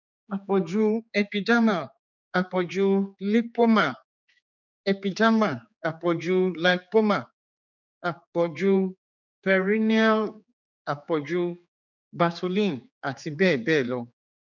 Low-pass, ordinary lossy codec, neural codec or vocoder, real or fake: 7.2 kHz; none; codec, 16 kHz, 4 kbps, X-Codec, HuBERT features, trained on general audio; fake